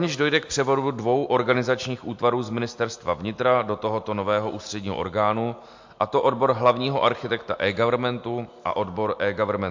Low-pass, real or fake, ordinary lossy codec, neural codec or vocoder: 7.2 kHz; real; MP3, 48 kbps; none